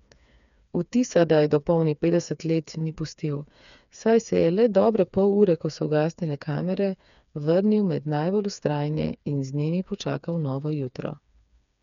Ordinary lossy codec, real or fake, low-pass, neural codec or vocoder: none; fake; 7.2 kHz; codec, 16 kHz, 4 kbps, FreqCodec, smaller model